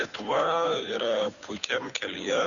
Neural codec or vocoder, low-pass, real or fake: codec, 16 kHz, 2 kbps, FunCodec, trained on Chinese and English, 25 frames a second; 7.2 kHz; fake